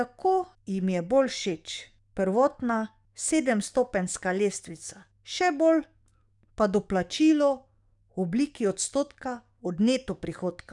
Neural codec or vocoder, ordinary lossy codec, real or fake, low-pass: codec, 44.1 kHz, 7.8 kbps, DAC; none; fake; 10.8 kHz